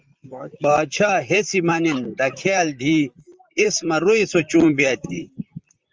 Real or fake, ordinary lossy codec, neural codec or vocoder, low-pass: fake; Opus, 32 kbps; vocoder, 44.1 kHz, 128 mel bands, Pupu-Vocoder; 7.2 kHz